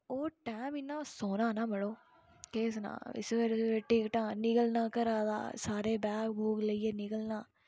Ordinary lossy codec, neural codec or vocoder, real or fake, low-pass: none; none; real; none